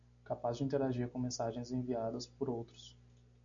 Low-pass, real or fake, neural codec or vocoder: 7.2 kHz; real; none